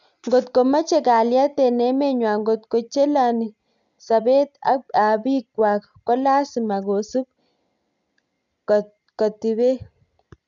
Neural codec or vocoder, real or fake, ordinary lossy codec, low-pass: none; real; none; 7.2 kHz